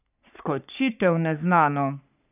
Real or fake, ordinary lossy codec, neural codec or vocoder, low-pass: fake; none; codec, 44.1 kHz, 3.4 kbps, Pupu-Codec; 3.6 kHz